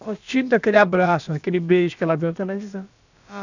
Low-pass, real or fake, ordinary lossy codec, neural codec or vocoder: 7.2 kHz; fake; none; codec, 16 kHz, about 1 kbps, DyCAST, with the encoder's durations